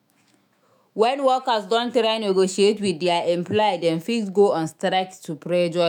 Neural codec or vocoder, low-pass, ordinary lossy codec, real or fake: autoencoder, 48 kHz, 128 numbers a frame, DAC-VAE, trained on Japanese speech; 19.8 kHz; none; fake